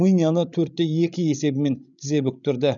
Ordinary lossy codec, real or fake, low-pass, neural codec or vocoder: none; fake; 7.2 kHz; codec, 16 kHz, 16 kbps, FreqCodec, larger model